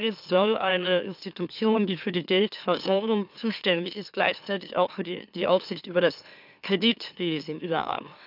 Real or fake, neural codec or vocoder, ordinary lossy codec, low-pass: fake; autoencoder, 44.1 kHz, a latent of 192 numbers a frame, MeloTTS; none; 5.4 kHz